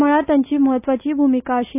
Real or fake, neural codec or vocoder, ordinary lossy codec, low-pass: real; none; none; 3.6 kHz